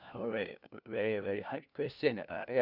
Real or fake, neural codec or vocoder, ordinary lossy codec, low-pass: fake; codec, 16 kHz, 1 kbps, FunCodec, trained on LibriTTS, 50 frames a second; none; 5.4 kHz